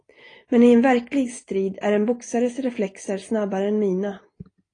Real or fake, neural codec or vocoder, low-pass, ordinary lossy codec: real; none; 9.9 kHz; AAC, 32 kbps